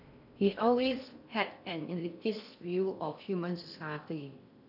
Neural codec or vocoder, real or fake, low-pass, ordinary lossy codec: codec, 16 kHz in and 24 kHz out, 0.6 kbps, FocalCodec, streaming, 4096 codes; fake; 5.4 kHz; none